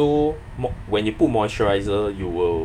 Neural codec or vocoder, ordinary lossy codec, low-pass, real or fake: autoencoder, 48 kHz, 128 numbers a frame, DAC-VAE, trained on Japanese speech; none; 19.8 kHz; fake